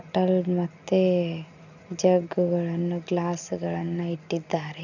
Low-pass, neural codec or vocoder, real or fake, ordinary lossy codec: 7.2 kHz; none; real; none